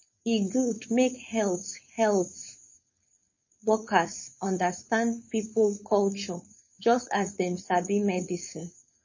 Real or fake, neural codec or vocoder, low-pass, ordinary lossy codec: fake; codec, 16 kHz, 4.8 kbps, FACodec; 7.2 kHz; MP3, 32 kbps